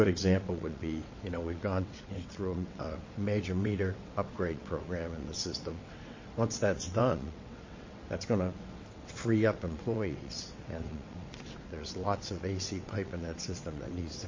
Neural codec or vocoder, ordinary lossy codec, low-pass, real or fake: vocoder, 22.05 kHz, 80 mel bands, WaveNeXt; MP3, 32 kbps; 7.2 kHz; fake